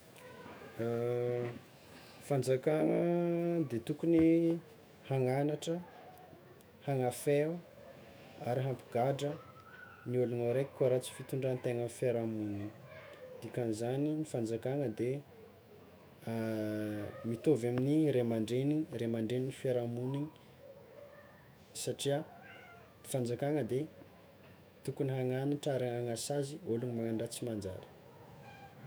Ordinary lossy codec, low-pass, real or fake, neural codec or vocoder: none; none; fake; autoencoder, 48 kHz, 128 numbers a frame, DAC-VAE, trained on Japanese speech